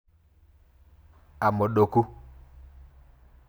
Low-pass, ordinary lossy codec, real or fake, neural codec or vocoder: none; none; real; none